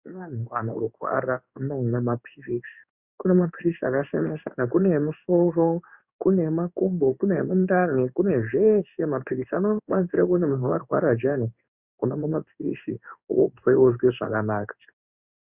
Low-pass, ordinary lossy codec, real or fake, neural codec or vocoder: 3.6 kHz; Opus, 64 kbps; fake; codec, 16 kHz in and 24 kHz out, 1 kbps, XY-Tokenizer